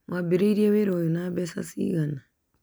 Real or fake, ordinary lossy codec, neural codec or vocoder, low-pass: real; none; none; none